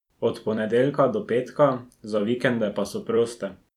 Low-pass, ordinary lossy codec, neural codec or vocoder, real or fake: 19.8 kHz; none; vocoder, 44.1 kHz, 128 mel bands every 256 samples, BigVGAN v2; fake